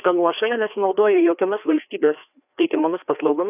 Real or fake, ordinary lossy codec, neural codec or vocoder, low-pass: fake; AAC, 32 kbps; codec, 16 kHz, 2 kbps, FreqCodec, larger model; 3.6 kHz